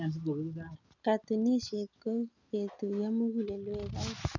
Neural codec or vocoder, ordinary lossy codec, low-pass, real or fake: none; none; 7.2 kHz; real